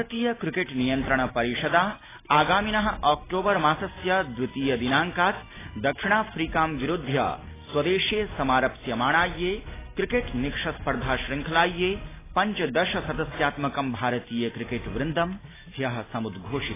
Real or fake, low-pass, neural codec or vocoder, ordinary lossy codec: real; 3.6 kHz; none; AAC, 16 kbps